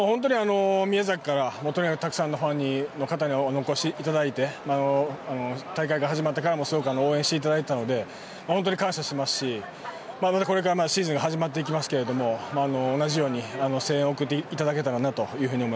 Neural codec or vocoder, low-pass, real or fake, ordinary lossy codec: none; none; real; none